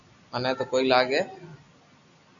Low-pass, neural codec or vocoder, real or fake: 7.2 kHz; none; real